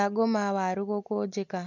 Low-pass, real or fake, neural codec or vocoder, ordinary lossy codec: 7.2 kHz; real; none; none